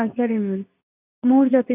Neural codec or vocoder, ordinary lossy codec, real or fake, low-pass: codec, 24 kHz, 0.9 kbps, WavTokenizer, medium speech release version 2; none; fake; 3.6 kHz